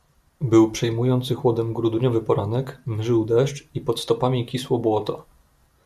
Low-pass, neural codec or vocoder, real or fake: 14.4 kHz; none; real